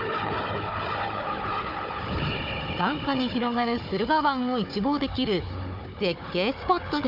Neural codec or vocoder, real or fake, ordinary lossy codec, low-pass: codec, 16 kHz, 4 kbps, FunCodec, trained on Chinese and English, 50 frames a second; fake; none; 5.4 kHz